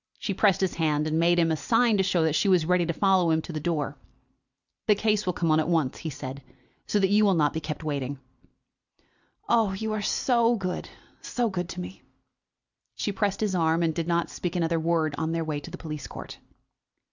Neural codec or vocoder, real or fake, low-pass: none; real; 7.2 kHz